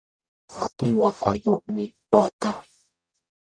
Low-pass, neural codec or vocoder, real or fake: 9.9 kHz; codec, 44.1 kHz, 0.9 kbps, DAC; fake